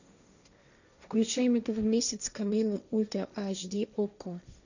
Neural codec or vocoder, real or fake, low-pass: codec, 16 kHz, 1.1 kbps, Voila-Tokenizer; fake; 7.2 kHz